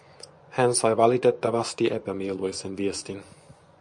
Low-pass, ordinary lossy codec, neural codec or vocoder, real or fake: 10.8 kHz; MP3, 64 kbps; vocoder, 24 kHz, 100 mel bands, Vocos; fake